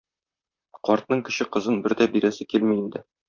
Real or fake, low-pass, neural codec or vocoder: fake; 7.2 kHz; vocoder, 44.1 kHz, 128 mel bands, Pupu-Vocoder